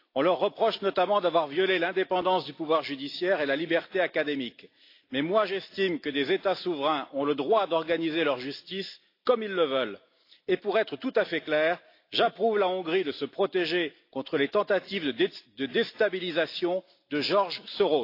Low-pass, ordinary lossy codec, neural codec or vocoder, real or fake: 5.4 kHz; AAC, 32 kbps; none; real